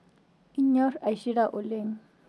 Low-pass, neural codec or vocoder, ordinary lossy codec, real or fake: none; none; none; real